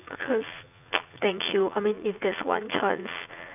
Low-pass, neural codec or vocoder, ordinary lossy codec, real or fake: 3.6 kHz; vocoder, 44.1 kHz, 80 mel bands, Vocos; none; fake